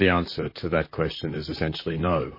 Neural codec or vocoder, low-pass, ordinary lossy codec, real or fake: vocoder, 44.1 kHz, 128 mel bands, Pupu-Vocoder; 5.4 kHz; MP3, 24 kbps; fake